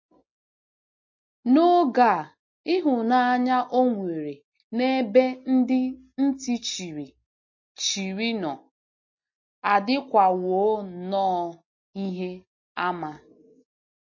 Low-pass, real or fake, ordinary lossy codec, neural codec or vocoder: 7.2 kHz; real; MP3, 32 kbps; none